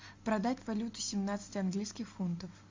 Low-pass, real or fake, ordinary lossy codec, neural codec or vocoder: 7.2 kHz; real; MP3, 64 kbps; none